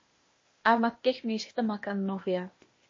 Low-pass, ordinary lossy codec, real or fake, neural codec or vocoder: 7.2 kHz; MP3, 32 kbps; fake; codec, 16 kHz, 0.8 kbps, ZipCodec